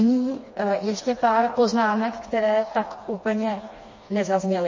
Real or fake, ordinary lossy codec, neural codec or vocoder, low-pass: fake; MP3, 32 kbps; codec, 16 kHz, 2 kbps, FreqCodec, smaller model; 7.2 kHz